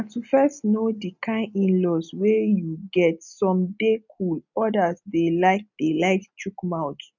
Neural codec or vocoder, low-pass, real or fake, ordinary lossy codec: none; 7.2 kHz; real; none